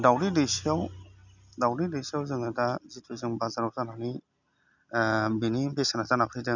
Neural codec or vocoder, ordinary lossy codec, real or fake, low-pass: none; none; real; 7.2 kHz